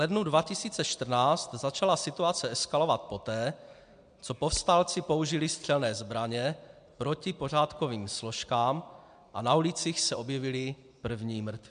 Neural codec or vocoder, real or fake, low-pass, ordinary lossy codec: none; real; 9.9 kHz; MP3, 64 kbps